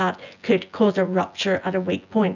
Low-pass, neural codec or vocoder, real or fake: 7.2 kHz; vocoder, 24 kHz, 100 mel bands, Vocos; fake